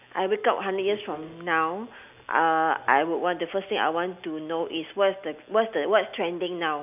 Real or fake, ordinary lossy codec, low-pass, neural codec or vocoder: real; none; 3.6 kHz; none